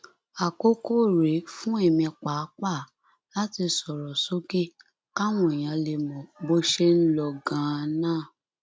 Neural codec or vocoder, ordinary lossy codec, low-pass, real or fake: none; none; none; real